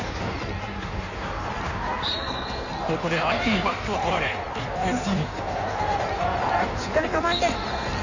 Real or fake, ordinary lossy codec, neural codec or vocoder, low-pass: fake; none; codec, 16 kHz in and 24 kHz out, 1.1 kbps, FireRedTTS-2 codec; 7.2 kHz